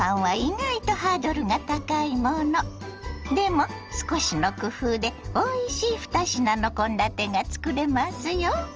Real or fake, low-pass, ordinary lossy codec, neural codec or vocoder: real; 7.2 kHz; Opus, 24 kbps; none